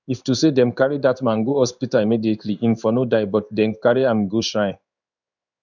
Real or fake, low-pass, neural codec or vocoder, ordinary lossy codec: fake; 7.2 kHz; codec, 16 kHz in and 24 kHz out, 1 kbps, XY-Tokenizer; none